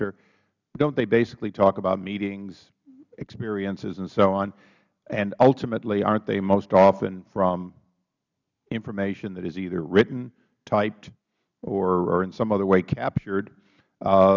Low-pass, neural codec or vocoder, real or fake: 7.2 kHz; none; real